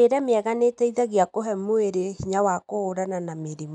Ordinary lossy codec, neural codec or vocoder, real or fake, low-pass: none; none; real; 10.8 kHz